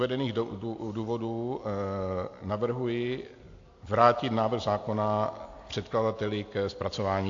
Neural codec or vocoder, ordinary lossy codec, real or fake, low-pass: none; AAC, 48 kbps; real; 7.2 kHz